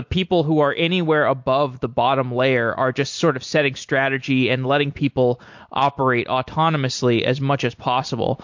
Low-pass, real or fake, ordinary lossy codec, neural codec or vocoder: 7.2 kHz; real; MP3, 48 kbps; none